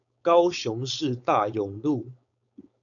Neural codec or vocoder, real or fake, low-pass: codec, 16 kHz, 4.8 kbps, FACodec; fake; 7.2 kHz